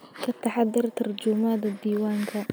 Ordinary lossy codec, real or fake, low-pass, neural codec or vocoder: none; real; none; none